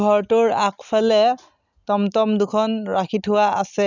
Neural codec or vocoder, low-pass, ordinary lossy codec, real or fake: none; 7.2 kHz; none; real